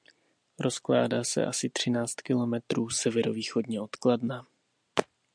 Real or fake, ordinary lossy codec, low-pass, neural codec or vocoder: real; MP3, 96 kbps; 9.9 kHz; none